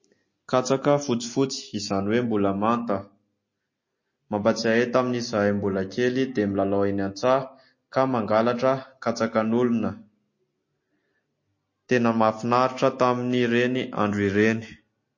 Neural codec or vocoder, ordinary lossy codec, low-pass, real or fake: none; MP3, 32 kbps; 7.2 kHz; real